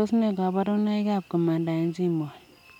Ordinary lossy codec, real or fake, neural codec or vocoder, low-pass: none; real; none; 19.8 kHz